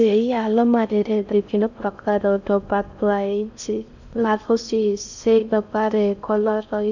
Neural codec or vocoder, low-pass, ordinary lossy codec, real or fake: codec, 16 kHz in and 24 kHz out, 0.6 kbps, FocalCodec, streaming, 4096 codes; 7.2 kHz; none; fake